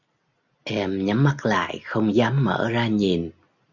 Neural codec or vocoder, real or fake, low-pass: none; real; 7.2 kHz